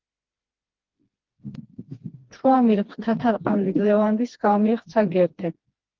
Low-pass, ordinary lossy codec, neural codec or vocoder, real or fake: 7.2 kHz; Opus, 16 kbps; codec, 16 kHz, 2 kbps, FreqCodec, smaller model; fake